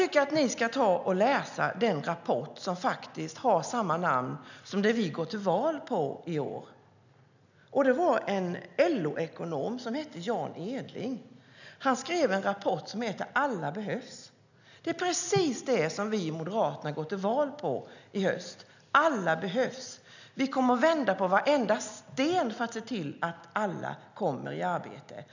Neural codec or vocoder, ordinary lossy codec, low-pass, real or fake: none; none; 7.2 kHz; real